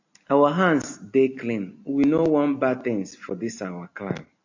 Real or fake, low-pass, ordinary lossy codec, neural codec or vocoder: real; 7.2 kHz; AAC, 48 kbps; none